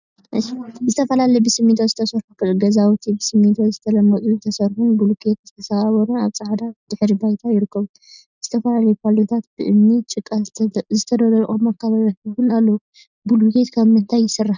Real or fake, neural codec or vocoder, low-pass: real; none; 7.2 kHz